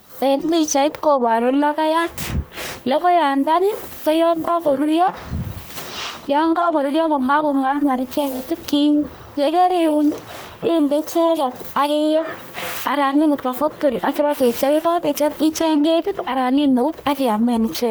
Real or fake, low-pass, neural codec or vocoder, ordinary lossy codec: fake; none; codec, 44.1 kHz, 1.7 kbps, Pupu-Codec; none